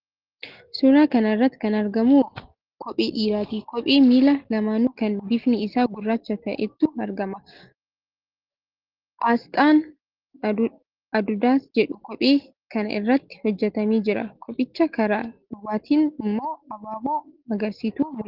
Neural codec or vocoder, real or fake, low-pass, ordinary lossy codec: none; real; 5.4 kHz; Opus, 16 kbps